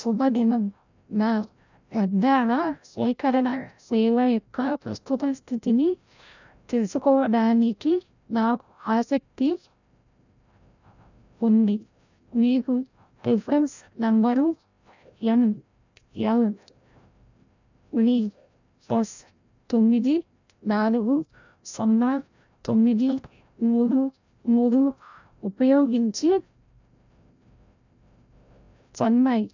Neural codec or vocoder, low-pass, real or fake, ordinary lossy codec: codec, 16 kHz, 0.5 kbps, FreqCodec, larger model; 7.2 kHz; fake; none